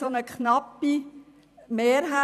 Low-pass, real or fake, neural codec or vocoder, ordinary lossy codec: 14.4 kHz; fake; vocoder, 44.1 kHz, 128 mel bands every 256 samples, BigVGAN v2; none